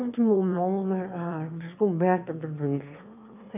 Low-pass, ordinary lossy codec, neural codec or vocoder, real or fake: 3.6 kHz; none; autoencoder, 22.05 kHz, a latent of 192 numbers a frame, VITS, trained on one speaker; fake